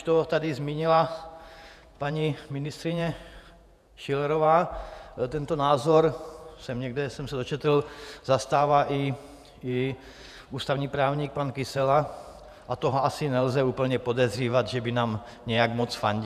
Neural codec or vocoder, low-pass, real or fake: vocoder, 48 kHz, 128 mel bands, Vocos; 14.4 kHz; fake